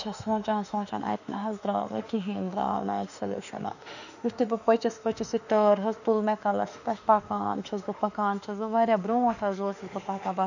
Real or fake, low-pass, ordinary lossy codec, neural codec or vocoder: fake; 7.2 kHz; none; autoencoder, 48 kHz, 32 numbers a frame, DAC-VAE, trained on Japanese speech